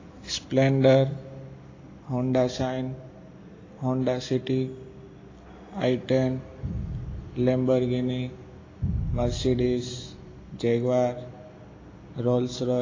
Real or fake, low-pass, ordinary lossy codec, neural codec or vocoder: real; 7.2 kHz; AAC, 32 kbps; none